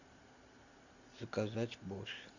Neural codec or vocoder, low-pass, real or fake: none; 7.2 kHz; real